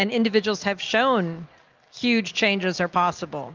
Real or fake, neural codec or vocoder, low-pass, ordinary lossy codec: real; none; 7.2 kHz; Opus, 24 kbps